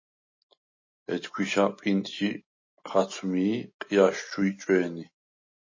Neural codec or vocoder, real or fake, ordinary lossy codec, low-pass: none; real; MP3, 32 kbps; 7.2 kHz